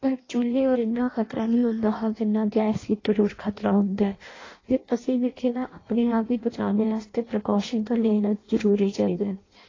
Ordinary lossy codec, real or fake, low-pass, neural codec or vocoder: AAC, 32 kbps; fake; 7.2 kHz; codec, 16 kHz in and 24 kHz out, 0.6 kbps, FireRedTTS-2 codec